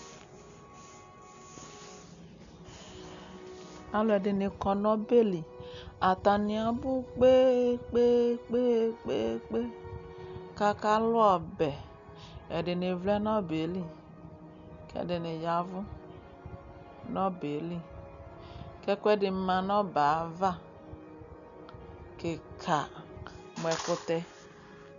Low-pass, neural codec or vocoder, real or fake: 7.2 kHz; none; real